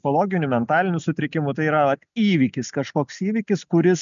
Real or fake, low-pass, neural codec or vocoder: real; 7.2 kHz; none